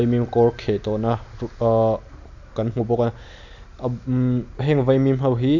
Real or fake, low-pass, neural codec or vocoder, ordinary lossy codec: real; 7.2 kHz; none; none